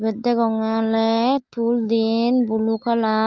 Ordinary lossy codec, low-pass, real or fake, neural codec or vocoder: Opus, 32 kbps; 7.2 kHz; real; none